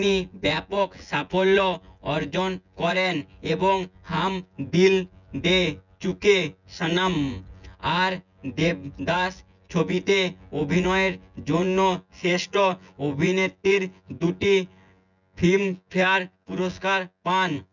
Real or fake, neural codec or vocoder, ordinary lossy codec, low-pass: fake; vocoder, 24 kHz, 100 mel bands, Vocos; none; 7.2 kHz